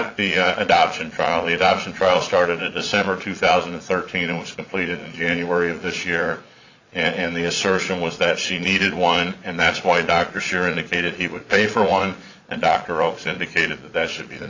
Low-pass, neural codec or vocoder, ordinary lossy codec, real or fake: 7.2 kHz; vocoder, 22.05 kHz, 80 mel bands, Vocos; AAC, 48 kbps; fake